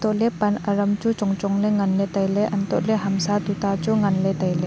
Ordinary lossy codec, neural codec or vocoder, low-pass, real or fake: none; none; none; real